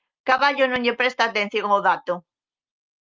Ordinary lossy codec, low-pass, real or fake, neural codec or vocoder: Opus, 24 kbps; 7.2 kHz; real; none